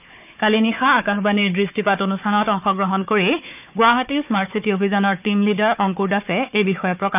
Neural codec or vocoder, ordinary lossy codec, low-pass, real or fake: codec, 16 kHz, 4 kbps, FunCodec, trained on Chinese and English, 50 frames a second; none; 3.6 kHz; fake